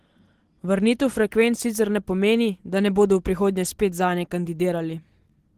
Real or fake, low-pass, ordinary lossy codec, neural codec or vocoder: real; 14.4 kHz; Opus, 16 kbps; none